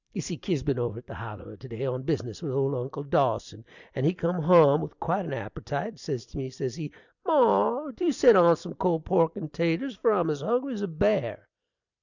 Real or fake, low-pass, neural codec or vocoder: fake; 7.2 kHz; vocoder, 44.1 kHz, 128 mel bands every 256 samples, BigVGAN v2